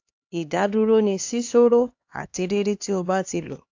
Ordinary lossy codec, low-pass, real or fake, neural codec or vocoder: AAC, 48 kbps; 7.2 kHz; fake; codec, 16 kHz, 2 kbps, X-Codec, HuBERT features, trained on LibriSpeech